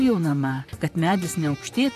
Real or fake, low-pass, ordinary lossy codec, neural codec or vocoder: fake; 14.4 kHz; AAC, 96 kbps; vocoder, 44.1 kHz, 128 mel bands, Pupu-Vocoder